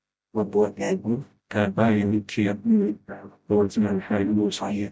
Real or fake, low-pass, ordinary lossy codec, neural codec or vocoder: fake; none; none; codec, 16 kHz, 0.5 kbps, FreqCodec, smaller model